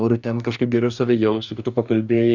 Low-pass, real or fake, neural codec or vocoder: 7.2 kHz; fake; codec, 44.1 kHz, 2.6 kbps, DAC